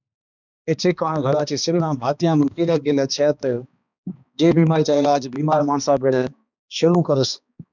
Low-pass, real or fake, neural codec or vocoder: 7.2 kHz; fake; codec, 16 kHz, 2 kbps, X-Codec, HuBERT features, trained on balanced general audio